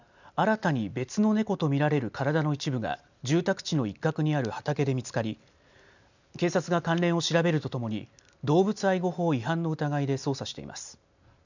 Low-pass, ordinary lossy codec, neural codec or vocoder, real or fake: 7.2 kHz; none; none; real